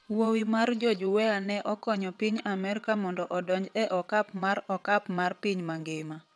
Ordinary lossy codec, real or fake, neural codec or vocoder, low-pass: none; fake; vocoder, 22.05 kHz, 80 mel bands, WaveNeXt; none